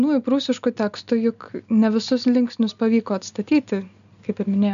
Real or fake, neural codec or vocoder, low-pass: real; none; 7.2 kHz